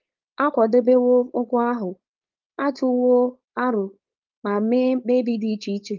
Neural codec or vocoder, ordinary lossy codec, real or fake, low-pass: codec, 16 kHz, 4.8 kbps, FACodec; Opus, 32 kbps; fake; 7.2 kHz